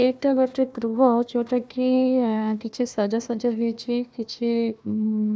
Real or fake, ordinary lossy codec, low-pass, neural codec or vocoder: fake; none; none; codec, 16 kHz, 1 kbps, FunCodec, trained on Chinese and English, 50 frames a second